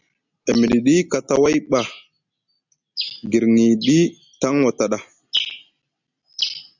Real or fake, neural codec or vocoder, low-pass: real; none; 7.2 kHz